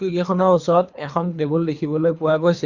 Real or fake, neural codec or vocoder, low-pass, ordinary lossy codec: fake; codec, 24 kHz, 3 kbps, HILCodec; 7.2 kHz; Opus, 64 kbps